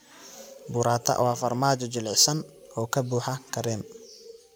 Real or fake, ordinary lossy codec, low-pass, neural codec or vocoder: real; none; none; none